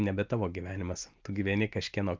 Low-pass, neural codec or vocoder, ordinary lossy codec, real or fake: 7.2 kHz; none; Opus, 32 kbps; real